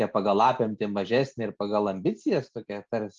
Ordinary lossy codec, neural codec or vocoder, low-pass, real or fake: Opus, 32 kbps; none; 7.2 kHz; real